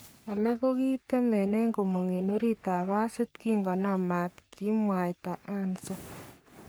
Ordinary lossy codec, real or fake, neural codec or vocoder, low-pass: none; fake; codec, 44.1 kHz, 3.4 kbps, Pupu-Codec; none